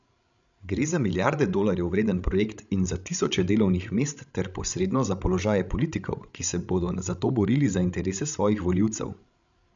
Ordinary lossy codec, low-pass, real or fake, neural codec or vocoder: none; 7.2 kHz; fake; codec, 16 kHz, 16 kbps, FreqCodec, larger model